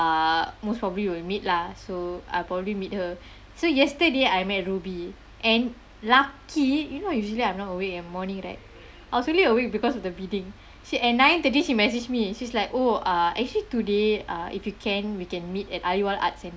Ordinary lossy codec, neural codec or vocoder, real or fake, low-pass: none; none; real; none